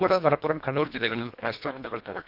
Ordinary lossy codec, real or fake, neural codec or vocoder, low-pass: none; fake; codec, 24 kHz, 1.5 kbps, HILCodec; 5.4 kHz